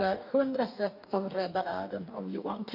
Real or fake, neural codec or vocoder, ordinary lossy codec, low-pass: fake; codec, 44.1 kHz, 2.6 kbps, DAC; none; 5.4 kHz